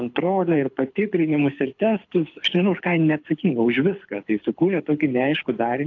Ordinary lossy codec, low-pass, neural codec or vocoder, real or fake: AAC, 48 kbps; 7.2 kHz; codec, 24 kHz, 6 kbps, HILCodec; fake